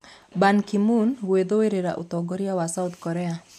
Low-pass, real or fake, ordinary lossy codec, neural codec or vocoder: 14.4 kHz; real; none; none